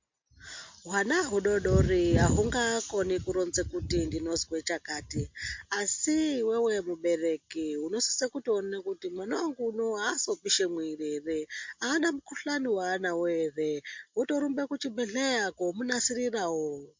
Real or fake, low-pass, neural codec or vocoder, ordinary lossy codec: real; 7.2 kHz; none; MP3, 48 kbps